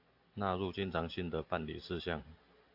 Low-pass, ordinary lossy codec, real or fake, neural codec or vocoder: 5.4 kHz; AAC, 48 kbps; real; none